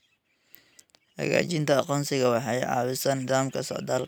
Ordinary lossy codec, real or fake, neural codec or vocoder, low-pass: none; real; none; none